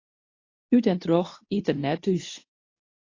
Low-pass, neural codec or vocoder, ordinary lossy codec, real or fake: 7.2 kHz; codec, 24 kHz, 0.9 kbps, WavTokenizer, medium speech release version 2; AAC, 32 kbps; fake